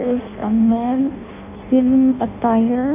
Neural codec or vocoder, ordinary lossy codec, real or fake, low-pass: codec, 16 kHz in and 24 kHz out, 0.6 kbps, FireRedTTS-2 codec; none; fake; 3.6 kHz